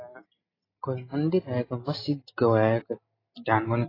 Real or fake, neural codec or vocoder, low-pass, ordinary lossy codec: real; none; 5.4 kHz; AAC, 24 kbps